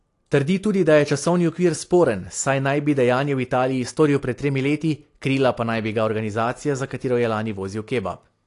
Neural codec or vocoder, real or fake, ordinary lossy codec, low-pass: none; real; AAC, 48 kbps; 10.8 kHz